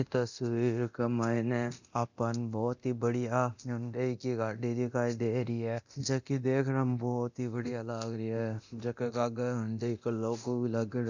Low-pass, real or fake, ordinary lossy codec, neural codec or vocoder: 7.2 kHz; fake; none; codec, 24 kHz, 0.9 kbps, DualCodec